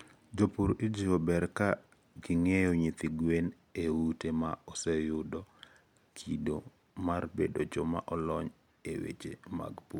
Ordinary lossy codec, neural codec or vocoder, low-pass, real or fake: MP3, 96 kbps; none; 19.8 kHz; real